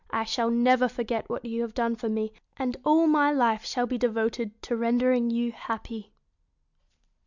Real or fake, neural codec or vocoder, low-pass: real; none; 7.2 kHz